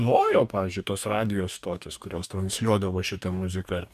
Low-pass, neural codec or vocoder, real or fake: 14.4 kHz; codec, 44.1 kHz, 2.6 kbps, DAC; fake